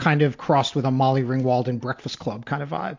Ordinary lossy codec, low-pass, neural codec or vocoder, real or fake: MP3, 48 kbps; 7.2 kHz; none; real